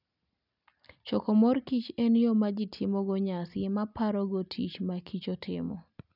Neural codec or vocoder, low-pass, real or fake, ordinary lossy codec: none; 5.4 kHz; real; none